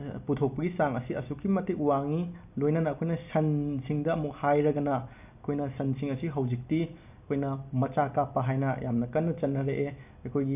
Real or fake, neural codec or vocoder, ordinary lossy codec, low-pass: real; none; none; 3.6 kHz